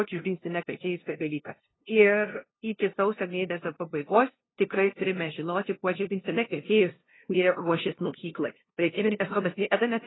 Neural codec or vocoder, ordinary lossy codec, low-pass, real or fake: codec, 16 kHz, 0.5 kbps, FunCodec, trained on LibriTTS, 25 frames a second; AAC, 16 kbps; 7.2 kHz; fake